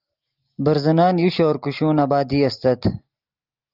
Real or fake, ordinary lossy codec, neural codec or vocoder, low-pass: real; Opus, 32 kbps; none; 5.4 kHz